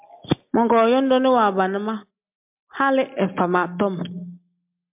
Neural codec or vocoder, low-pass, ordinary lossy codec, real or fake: none; 3.6 kHz; MP3, 32 kbps; real